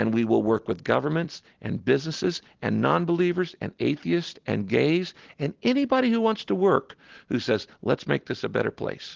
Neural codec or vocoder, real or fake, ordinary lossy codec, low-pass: none; real; Opus, 16 kbps; 7.2 kHz